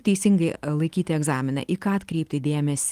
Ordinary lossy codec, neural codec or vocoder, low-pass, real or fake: Opus, 24 kbps; none; 14.4 kHz; real